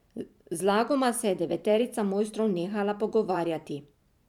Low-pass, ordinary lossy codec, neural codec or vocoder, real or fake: 19.8 kHz; none; vocoder, 44.1 kHz, 128 mel bands every 512 samples, BigVGAN v2; fake